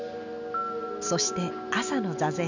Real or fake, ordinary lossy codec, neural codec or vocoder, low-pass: real; none; none; 7.2 kHz